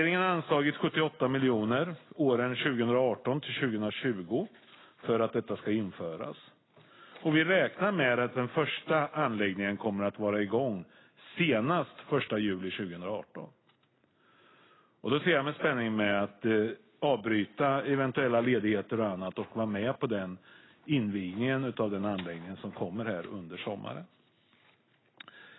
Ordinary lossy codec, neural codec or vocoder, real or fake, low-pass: AAC, 16 kbps; none; real; 7.2 kHz